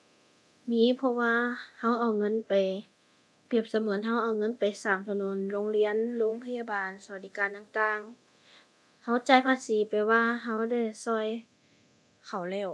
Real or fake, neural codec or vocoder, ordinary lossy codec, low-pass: fake; codec, 24 kHz, 0.9 kbps, DualCodec; none; none